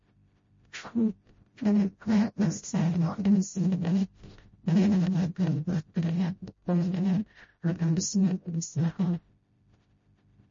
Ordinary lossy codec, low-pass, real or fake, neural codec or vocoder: MP3, 32 kbps; 7.2 kHz; fake; codec, 16 kHz, 0.5 kbps, FreqCodec, smaller model